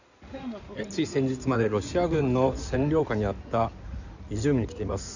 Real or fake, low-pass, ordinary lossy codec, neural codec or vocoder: fake; 7.2 kHz; none; codec, 16 kHz in and 24 kHz out, 2.2 kbps, FireRedTTS-2 codec